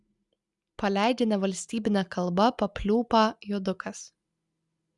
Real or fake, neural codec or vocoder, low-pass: real; none; 10.8 kHz